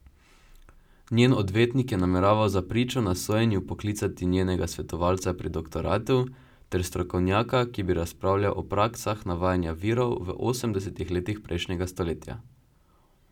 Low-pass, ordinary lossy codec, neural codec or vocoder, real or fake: 19.8 kHz; none; none; real